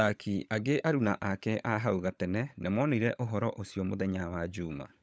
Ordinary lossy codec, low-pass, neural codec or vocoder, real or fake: none; none; codec, 16 kHz, 16 kbps, FunCodec, trained on LibriTTS, 50 frames a second; fake